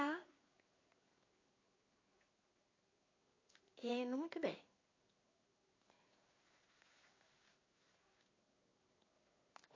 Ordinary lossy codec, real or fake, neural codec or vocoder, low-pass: MP3, 32 kbps; fake; codec, 16 kHz in and 24 kHz out, 1 kbps, XY-Tokenizer; 7.2 kHz